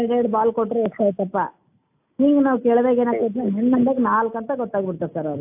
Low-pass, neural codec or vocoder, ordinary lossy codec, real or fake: 3.6 kHz; none; AAC, 32 kbps; real